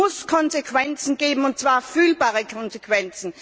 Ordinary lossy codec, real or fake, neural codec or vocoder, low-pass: none; real; none; none